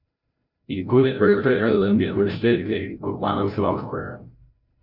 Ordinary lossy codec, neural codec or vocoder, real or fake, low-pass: none; codec, 16 kHz, 0.5 kbps, FreqCodec, larger model; fake; 5.4 kHz